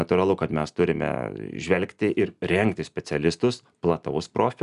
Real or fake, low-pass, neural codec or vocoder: real; 10.8 kHz; none